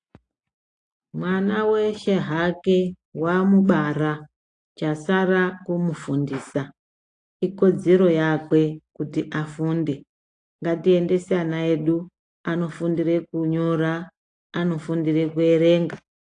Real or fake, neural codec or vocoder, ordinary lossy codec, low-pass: real; none; AAC, 48 kbps; 9.9 kHz